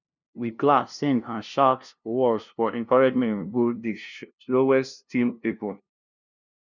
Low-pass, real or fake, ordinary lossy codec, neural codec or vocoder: 7.2 kHz; fake; none; codec, 16 kHz, 0.5 kbps, FunCodec, trained on LibriTTS, 25 frames a second